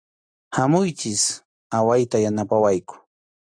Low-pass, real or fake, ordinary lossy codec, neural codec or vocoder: 9.9 kHz; real; AAC, 64 kbps; none